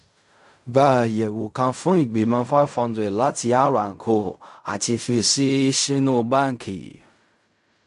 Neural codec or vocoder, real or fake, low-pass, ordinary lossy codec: codec, 16 kHz in and 24 kHz out, 0.4 kbps, LongCat-Audio-Codec, fine tuned four codebook decoder; fake; 10.8 kHz; AAC, 96 kbps